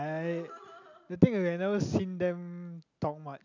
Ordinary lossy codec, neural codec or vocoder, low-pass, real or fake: none; none; 7.2 kHz; real